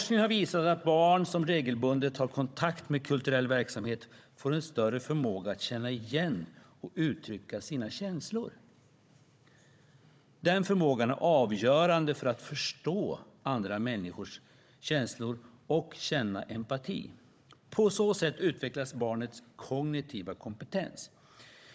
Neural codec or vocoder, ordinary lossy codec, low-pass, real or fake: codec, 16 kHz, 16 kbps, FunCodec, trained on Chinese and English, 50 frames a second; none; none; fake